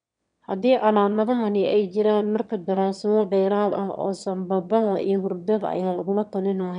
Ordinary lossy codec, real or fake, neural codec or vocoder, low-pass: MP3, 96 kbps; fake; autoencoder, 22.05 kHz, a latent of 192 numbers a frame, VITS, trained on one speaker; 9.9 kHz